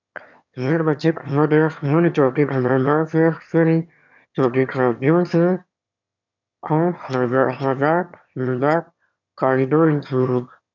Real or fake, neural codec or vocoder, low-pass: fake; autoencoder, 22.05 kHz, a latent of 192 numbers a frame, VITS, trained on one speaker; 7.2 kHz